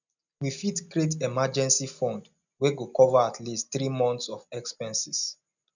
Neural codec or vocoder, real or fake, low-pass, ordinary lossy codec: none; real; 7.2 kHz; none